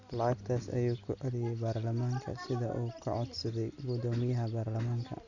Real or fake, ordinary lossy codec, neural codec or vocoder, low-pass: real; none; none; 7.2 kHz